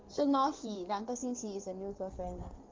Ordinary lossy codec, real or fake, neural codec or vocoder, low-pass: Opus, 32 kbps; fake; codec, 16 kHz in and 24 kHz out, 2.2 kbps, FireRedTTS-2 codec; 7.2 kHz